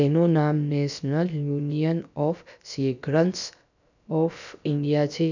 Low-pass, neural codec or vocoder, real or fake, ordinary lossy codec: 7.2 kHz; codec, 16 kHz, about 1 kbps, DyCAST, with the encoder's durations; fake; none